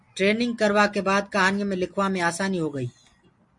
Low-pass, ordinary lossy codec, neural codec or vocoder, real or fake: 10.8 kHz; MP3, 48 kbps; none; real